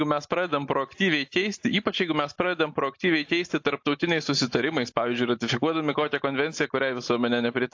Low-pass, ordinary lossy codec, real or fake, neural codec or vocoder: 7.2 kHz; AAC, 48 kbps; real; none